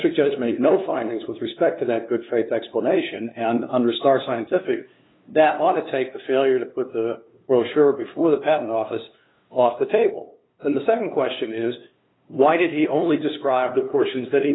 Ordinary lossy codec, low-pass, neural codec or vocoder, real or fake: AAC, 16 kbps; 7.2 kHz; codec, 16 kHz, 2 kbps, FunCodec, trained on LibriTTS, 25 frames a second; fake